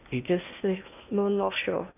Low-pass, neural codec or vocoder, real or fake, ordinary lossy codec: 3.6 kHz; codec, 16 kHz in and 24 kHz out, 0.8 kbps, FocalCodec, streaming, 65536 codes; fake; none